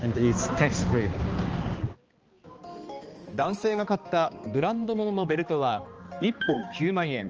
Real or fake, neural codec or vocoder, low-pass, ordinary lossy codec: fake; codec, 16 kHz, 2 kbps, X-Codec, HuBERT features, trained on balanced general audio; 7.2 kHz; Opus, 24 kbps